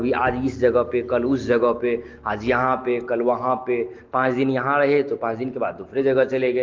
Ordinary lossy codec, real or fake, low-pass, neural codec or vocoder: Opus, 16 kbps; real; 7.2 kHz; none